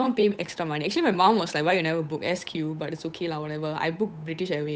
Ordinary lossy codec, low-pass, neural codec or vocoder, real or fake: none; none; codec, 16 kHz, 8 kbps, FunCodec, trained on Chinese and English, 25 frames a second; fake